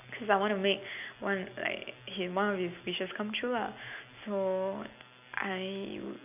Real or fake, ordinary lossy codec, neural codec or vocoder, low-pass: real; none; none; 3.6 kHz